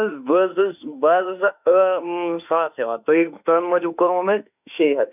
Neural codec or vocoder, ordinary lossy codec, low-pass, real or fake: autoencoder, 48 kHz, 32 numbers a frame, DAC-VAE, trained on Japanese speech; none; 3.6 kHz; fake